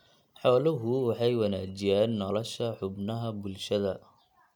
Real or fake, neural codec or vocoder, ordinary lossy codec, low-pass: real; none; none; 19.8 kHz